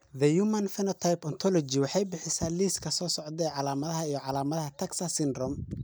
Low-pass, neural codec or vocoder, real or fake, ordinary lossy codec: none; none; real; none